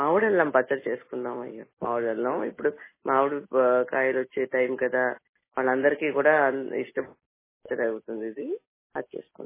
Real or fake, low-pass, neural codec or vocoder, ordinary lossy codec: real; 3.6 kHz; none; MP3, 16 kbps